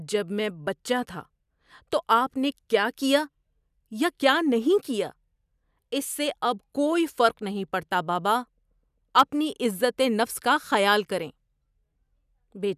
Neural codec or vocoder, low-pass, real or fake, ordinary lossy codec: none; 14.4 kHz; real; none